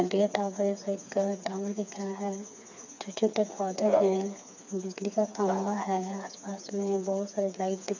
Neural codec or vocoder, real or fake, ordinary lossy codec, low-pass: codec, 16 kHz, 4 kbps, FreqCodec, smaller model; fake; none; 7.2 kHz